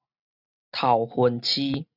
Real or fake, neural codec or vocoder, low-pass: real; none; 5.4 kHz